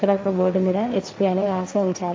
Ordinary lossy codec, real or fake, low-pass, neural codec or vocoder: none; fake; none; codec, 16 kHz, 1.1 kbps, Voila-Tokenizer